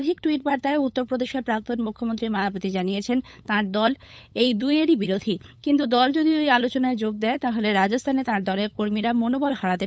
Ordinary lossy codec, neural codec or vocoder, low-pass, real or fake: none; codec, 16 kHz, 8 kbps, FunCodec, trained on LibriTTS, 25 frames a second; none; fake